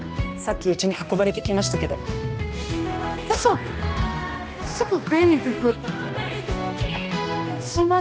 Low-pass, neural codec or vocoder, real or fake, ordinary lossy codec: none; codec, 16 kHz, 1 kbps, X-Codec, HuBERT features, trained on balanced general audio; fake; none